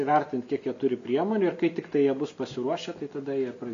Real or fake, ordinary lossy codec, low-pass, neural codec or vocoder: real; MP3, 64 kbps; 7.2 kHz; none